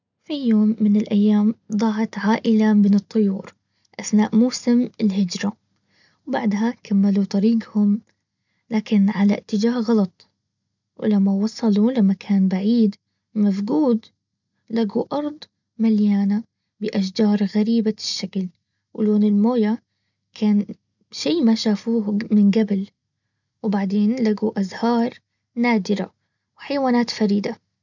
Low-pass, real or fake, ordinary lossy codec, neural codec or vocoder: 7.2 kHz; real; none; none